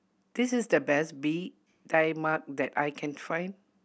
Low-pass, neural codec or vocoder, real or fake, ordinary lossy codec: none; none; real; none